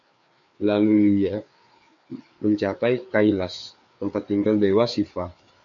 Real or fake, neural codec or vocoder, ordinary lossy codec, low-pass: fake; codec, 16 kHz, 4 kbps, FreqCodec, larger model; MP3, 96 kbps; 7.2 kHz